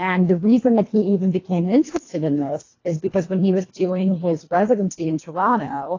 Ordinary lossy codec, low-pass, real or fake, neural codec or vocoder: AAC, 32 kbps; 7.2 kHz; fake; codec, 24 kHz, 1.5 kbps, HILCodec